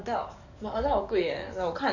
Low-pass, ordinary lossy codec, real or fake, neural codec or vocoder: 7.2 kHz; none; fake; codec, 44.1 kHz, 7.8 kbps, DAC